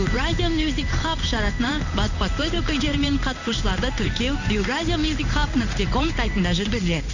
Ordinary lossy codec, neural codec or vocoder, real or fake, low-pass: none; codec, 16 kHz in and 24 kHz out, 1 kbps, XY-Tokenizer; fake; 7.2 kHz